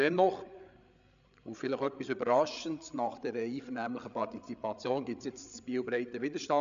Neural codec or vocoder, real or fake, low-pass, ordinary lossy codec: codec, 16 kHz, 8 kbps, FreqCodec, larger model; fake; 7.2 kHz; AAC, 96 kbps